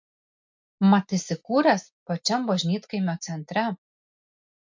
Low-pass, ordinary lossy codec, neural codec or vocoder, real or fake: 7.2 kHz; MP3, 48 kbps; none; real